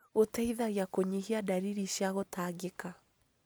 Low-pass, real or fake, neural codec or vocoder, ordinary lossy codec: none; real; none; none